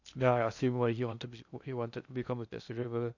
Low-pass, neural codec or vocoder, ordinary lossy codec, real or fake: 7.2 kHz; codec, 16 kHz in and 24 kHz out, 0.8 kbps, FocalCodec, streaming, 65536 codes; none; fake